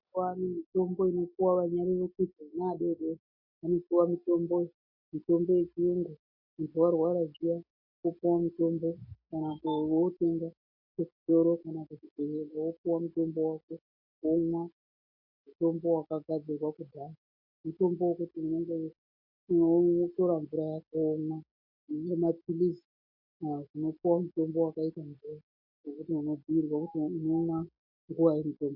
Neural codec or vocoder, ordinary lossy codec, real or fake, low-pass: none; MP3, 48 kbps; real; 5.4 kHz